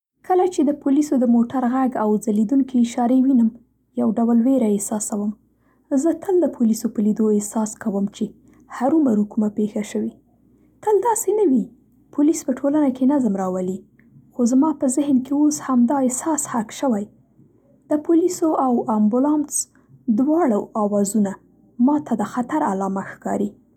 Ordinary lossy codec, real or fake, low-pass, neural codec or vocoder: none; real; 19.8 kHz; none